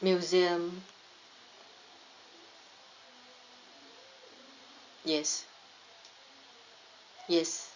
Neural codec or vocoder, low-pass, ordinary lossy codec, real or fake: none; 7.2 kHz; Opus, 64 kbps; real